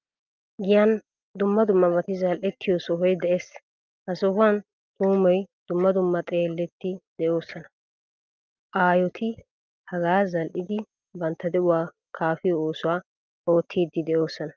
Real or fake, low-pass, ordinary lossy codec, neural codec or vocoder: real; 7.2 kHz; Opus, 24 kbps; none